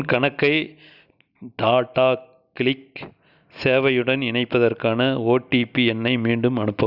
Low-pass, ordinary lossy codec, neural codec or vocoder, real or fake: 5.4 kHz; none; none; real